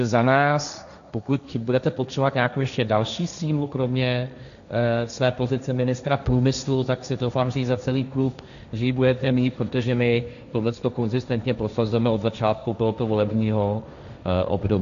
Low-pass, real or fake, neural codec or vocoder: 7.2 kHz; fake; codec, 16 kHz, 1.1 kbps, Voila-Tokenizer